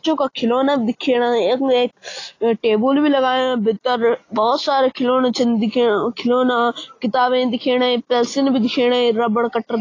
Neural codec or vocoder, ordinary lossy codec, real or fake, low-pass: none; AAC, 32 kbps; real; 7.2 kHz